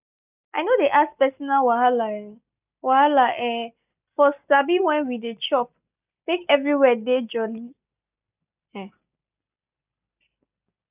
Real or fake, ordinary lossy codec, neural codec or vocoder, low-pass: real; none; none; 3.6 kHz